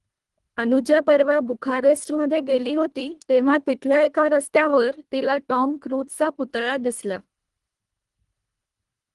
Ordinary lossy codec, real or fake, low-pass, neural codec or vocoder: Opus, 24 kbps; fake; 10.8 kHz; codec, 24 kHz, 1.5 kbps, HILCodec